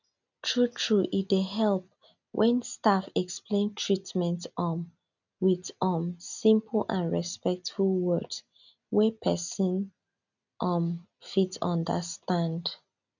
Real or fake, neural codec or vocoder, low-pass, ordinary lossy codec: real; none; 7.2 kHz; none